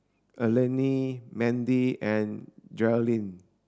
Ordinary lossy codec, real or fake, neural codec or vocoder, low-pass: none; real; none; none